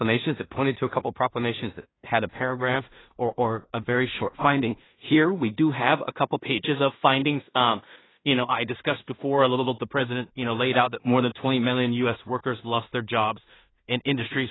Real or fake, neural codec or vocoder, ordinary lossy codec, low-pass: fake; codec, 16 kHz in and 24 kHz out, 0.4 kbps, LongCat-Audio-Codec, two codebook decoder; AAC, 16 kbps; 7.2 kHz